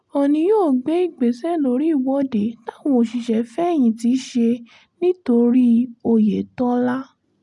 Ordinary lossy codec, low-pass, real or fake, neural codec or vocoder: none; none; real; none